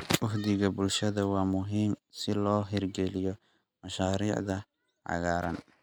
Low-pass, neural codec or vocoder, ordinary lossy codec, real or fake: 19.8 kHz; none; none; real